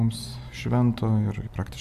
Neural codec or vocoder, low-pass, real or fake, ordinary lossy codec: none; 14.4 kHz; real; AAC, 96 kbps